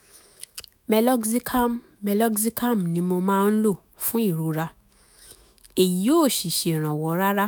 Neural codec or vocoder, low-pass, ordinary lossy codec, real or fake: autoencoder, 48 kHz, 128 numbers a frame, DAC-VAE, trained on Japanese speech; none; none; fake